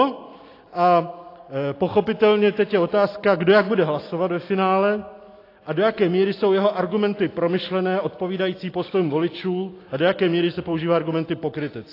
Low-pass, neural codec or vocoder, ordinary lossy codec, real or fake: 5.4 kHz; none; AAC, 32 kbps; real